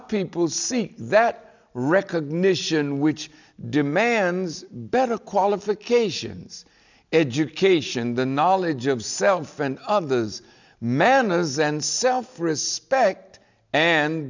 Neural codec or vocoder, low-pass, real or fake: none; 7.2 kHz; real